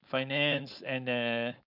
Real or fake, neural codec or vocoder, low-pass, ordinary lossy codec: fake; codec, 16 kHz, 4.8 kbps, FACodec; 5.4 kHz; none